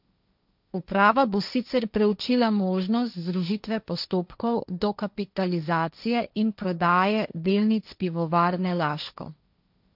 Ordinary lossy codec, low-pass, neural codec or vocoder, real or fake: none; 5.4 kHz; codec, 16 kHz, 1.1 kbps, Voila-Tokenizer; fake